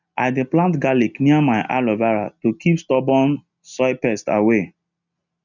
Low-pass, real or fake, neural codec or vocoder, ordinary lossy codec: 7.2 kHz; real; none; none